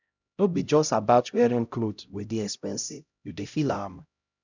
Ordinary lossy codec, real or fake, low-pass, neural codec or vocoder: none; fake; 7.2 kHz; codec, 16 kHz, 0.5 kbps, X-Codec, HuBERT features, trained on LibriSpeech